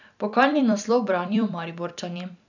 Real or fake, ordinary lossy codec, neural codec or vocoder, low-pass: fake; none; vocoder, 44.1 kHz, 128 mel bands every 256 samples, BigVGAN v2; 7.2 kHz